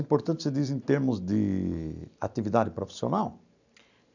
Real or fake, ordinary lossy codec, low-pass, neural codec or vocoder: real; none; 7.2 kHz; none